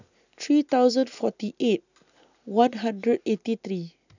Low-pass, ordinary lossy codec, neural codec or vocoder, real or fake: 7.2 kHz; none; none; real